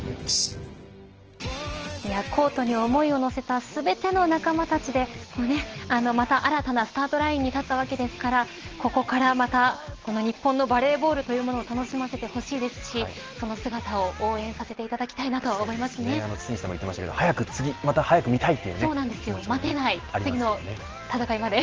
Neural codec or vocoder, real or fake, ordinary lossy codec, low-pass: none; real; Opus, 16 kbps; 7.2 kHz